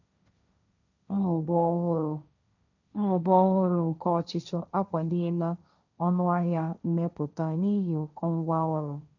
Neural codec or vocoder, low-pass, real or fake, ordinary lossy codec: codec, 16 kHz, 1.1 kbps, Voila-Tokenizer; 7.2 kHz; fake; none